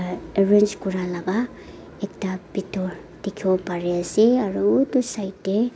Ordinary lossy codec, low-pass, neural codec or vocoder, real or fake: none; none; none; real